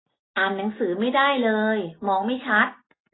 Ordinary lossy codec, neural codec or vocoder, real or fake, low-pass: AAC, 16 kbps; none; real; 7.2 kHz